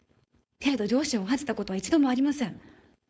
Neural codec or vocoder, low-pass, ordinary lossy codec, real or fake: codec, 16 kHz, 4.8 kbps, FACodec; none; none; fake